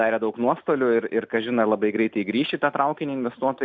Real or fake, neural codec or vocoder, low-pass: real; none; 7.2 kHz